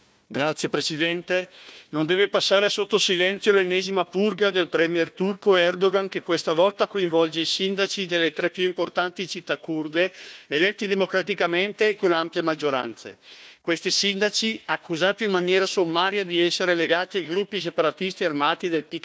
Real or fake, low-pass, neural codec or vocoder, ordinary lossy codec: fake; none; codec, 16 kHz, 1 kbps, FunCodec, trained on Chinese and English, 50 frames a second; none